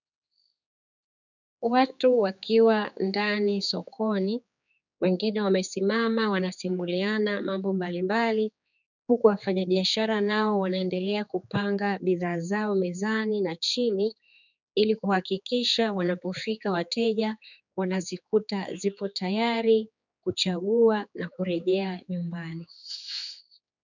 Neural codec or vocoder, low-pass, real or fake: codec, 16 kHz, 4 kbps, X-Codec, HuBERT features, trained on general audio; 7.2 kHz; fake